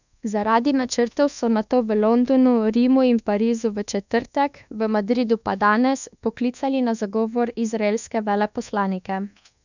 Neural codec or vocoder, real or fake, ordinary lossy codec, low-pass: codec, 24 kHz, 1.2 kbps, DualCodec; fake; none; 7.2 kHz